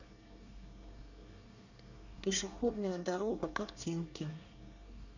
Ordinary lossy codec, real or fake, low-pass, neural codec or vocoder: none; fake; 7.2 kHz; codec, 24 kHz, 1 kbps, SNAC